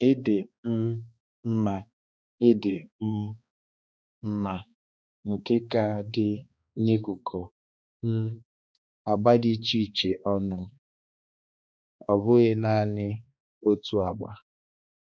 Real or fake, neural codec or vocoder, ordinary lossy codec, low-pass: fake; codec, 16 kHz, 2 kbps, X-Codec, HuBERT features, trained on balanced general audio; none; none